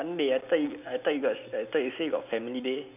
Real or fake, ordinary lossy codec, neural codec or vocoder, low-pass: real; none; none; 3.6 kHz